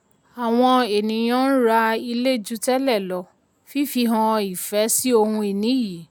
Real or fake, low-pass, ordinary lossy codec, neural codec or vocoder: real; none; none; none